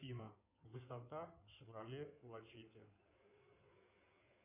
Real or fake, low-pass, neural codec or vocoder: fake; 3.6 kHz; codec, 16 kHz in and 24 kHz out, 2.2 kbps, FireRedTTS-2 codec